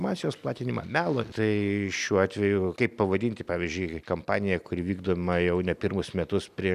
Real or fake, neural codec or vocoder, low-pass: real; none; 14.4 kHz